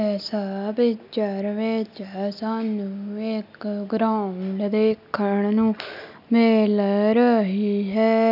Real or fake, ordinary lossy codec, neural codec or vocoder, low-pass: real; none; none; 5.4 kHz